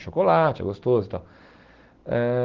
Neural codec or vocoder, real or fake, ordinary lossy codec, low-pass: none; real; Opus, 16 kbps; 7.2 kHz